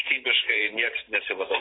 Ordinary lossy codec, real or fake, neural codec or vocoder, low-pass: AAC, 16 kbps; fake; vocoder, 44.1 kHz, 128 mel bands every 512 samples, BigVGAN v2; 7.2 kHz